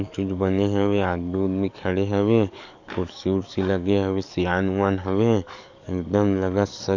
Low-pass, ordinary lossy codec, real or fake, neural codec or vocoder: 7.2 kHz; none; fake; codec, 44.1 kHz, 7.8 kbps, DAC